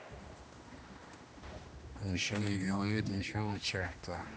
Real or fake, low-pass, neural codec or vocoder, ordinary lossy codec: fake; none; codec, 16 kHz, 1 kbps, X-Codec, HuBERT features, trained on general audio; none